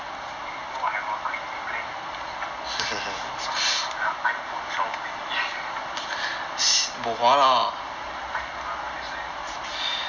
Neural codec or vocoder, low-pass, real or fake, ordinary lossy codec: none; 7.2 kHz; real; none